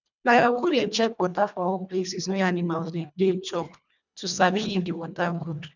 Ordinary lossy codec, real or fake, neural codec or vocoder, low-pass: none; fake; codec, 24 kHz, 1.5 kbps, HILCodec; 7.2 kHz